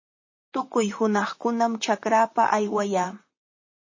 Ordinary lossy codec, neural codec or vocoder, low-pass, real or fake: MP3, 32 kbps; vocoder, 44.1 kHz, 128 mel bands, Pupu-Vocoder; 7.2 kHz; fake